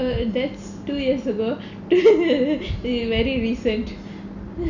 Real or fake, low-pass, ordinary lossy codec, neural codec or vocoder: real; 7.2 kHz; none; none